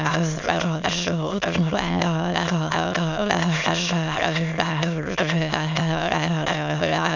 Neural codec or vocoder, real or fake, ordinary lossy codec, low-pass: autoencoder, 22.05 kHz, a latent of 192 numbers a frame, VITS, trained on many speakers; fake; none; 7.2 kHz